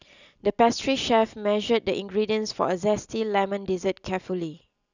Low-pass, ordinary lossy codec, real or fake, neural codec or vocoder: 7.2 kHz; none; real; none